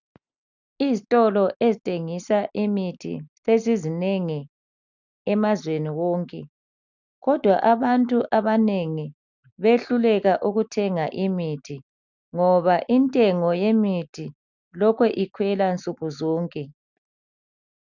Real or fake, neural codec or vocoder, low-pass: real; none; 7.2 kHz